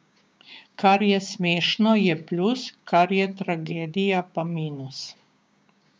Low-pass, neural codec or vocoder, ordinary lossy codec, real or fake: none; codec, 16 kHz, 6 kbps, DAC; none; fake